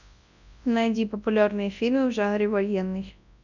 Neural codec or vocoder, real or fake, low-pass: codec, 24 kHz, 0.9 kbps, WavTokenizer, large speech release; fake; 7.2 kHz